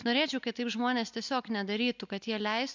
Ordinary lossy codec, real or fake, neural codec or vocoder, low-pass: MP3, 64 kbps; real; none; 7.2 kHz